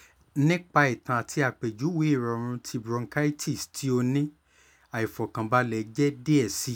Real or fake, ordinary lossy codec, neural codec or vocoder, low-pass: real; none; none; none